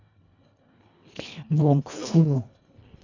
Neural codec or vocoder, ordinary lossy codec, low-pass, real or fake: codec, 24 kHz, 1.5 kbps, HILCodec; none; 7.2 kHz; fake